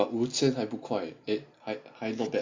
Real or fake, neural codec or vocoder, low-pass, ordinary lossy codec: real; none; 7.2 kHz; MP3, 64 kbps